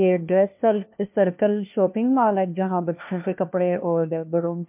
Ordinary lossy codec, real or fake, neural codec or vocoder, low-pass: MP3, 32 kbps; fake; codec, 16 kHz, 1 kbps, FunCodec, trained on LibriTTS, 50 frames a second; 3.6 kHz